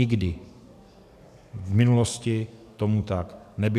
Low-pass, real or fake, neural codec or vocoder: 14.4 kHz; fake; codec, 44.1 kHz, 7.8 kbps, DAC